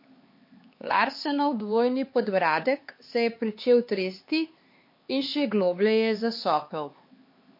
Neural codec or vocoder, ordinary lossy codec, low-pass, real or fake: codec, 16 kHz, 4 kbps, X-Codec, HuBERT features, trained on LibriSpeech; MP3, 32 kbps; 5.4 kHz; fake